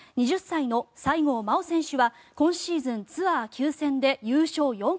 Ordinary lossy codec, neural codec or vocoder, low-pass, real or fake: none; none; none; real